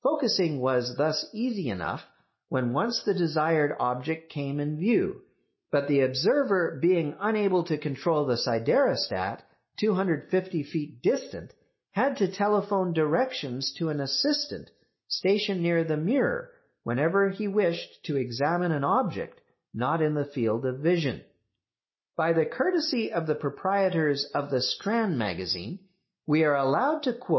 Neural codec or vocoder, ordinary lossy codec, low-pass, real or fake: none; MP3, 24 kbps; 7.2 kHz; real